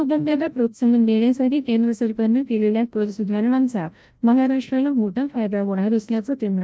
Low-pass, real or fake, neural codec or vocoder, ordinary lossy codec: none; fake; codec, 16 kHz, 0.5 kbps, FreqCodec, larger model; none